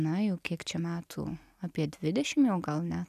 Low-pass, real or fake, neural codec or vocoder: 14.4 kHz; real; none